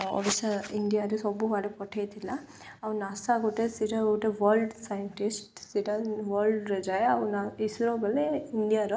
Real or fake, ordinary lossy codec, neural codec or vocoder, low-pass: real; none; none; none